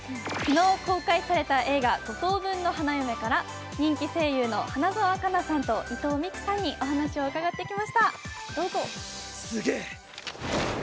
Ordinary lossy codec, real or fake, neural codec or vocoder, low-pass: none; real; none; none